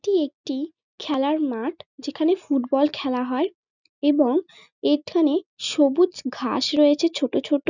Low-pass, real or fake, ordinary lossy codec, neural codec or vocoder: 7.2 kHz; real; none; none